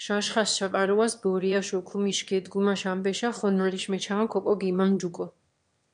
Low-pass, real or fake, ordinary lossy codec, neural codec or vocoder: 9.9 kHz; fake; MP3, 64 kbps; autoencoder, 22.05 kHz, a latent of 192 numbers a frame, VITS, trained on one speaker